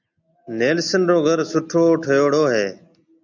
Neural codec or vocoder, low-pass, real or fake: none; 7.2 kHz; real